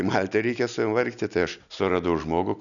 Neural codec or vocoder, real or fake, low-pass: none; real; 7.2 kHz